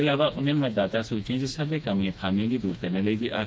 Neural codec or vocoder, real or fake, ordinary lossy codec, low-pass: codec, 16 kHz, 2 kbps, FreqCodec, smaller model; fake; none; none